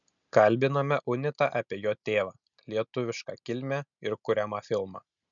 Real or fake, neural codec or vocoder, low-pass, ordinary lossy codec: real; none; 7.2 kHz; MP3, 96 kbps